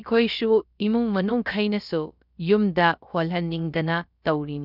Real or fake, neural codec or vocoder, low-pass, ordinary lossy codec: fake; codec, 16 kHz, about 1 kbps, DyCAST, with the encoder's durations; 5.4 kHz; none